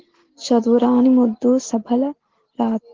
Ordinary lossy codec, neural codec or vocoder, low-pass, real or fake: Opus, 16 kbps; none; 7.2 kHz; real